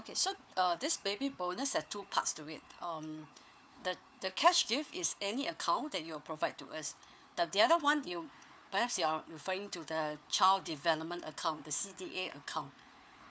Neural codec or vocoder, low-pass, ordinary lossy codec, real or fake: codec, 16 kHz, 4 kbps, FunCodec, trained on Chinese and English, 50 frames a second; none; none; fake